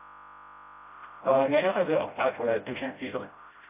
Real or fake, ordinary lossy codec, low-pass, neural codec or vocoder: fake; AAC, 24 kbps; 3.6 kHz; codec, 16 kHz, 0.5 kbps, FreqCodec, smaller model